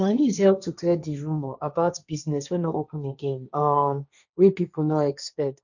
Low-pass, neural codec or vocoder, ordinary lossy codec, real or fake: 7.2 kHz; codec, 16 kHz, 1.1 kbps, Voila-Tokenizer; none; fake